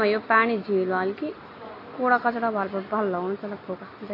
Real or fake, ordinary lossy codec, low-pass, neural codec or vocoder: real; AAC, 24 kbps; 5.4 kHz; none